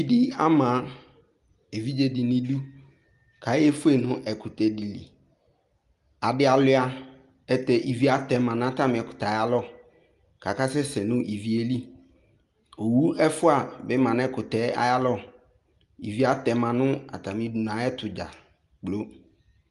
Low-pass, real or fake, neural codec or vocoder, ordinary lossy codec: 10.8 kHz; real; none; Opus, 32 kbps